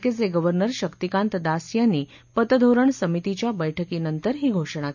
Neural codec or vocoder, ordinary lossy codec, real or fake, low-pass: none; none; real; 7.2 kHz